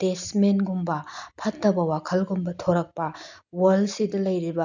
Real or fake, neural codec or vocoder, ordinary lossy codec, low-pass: real; none; none; 7.2 kHz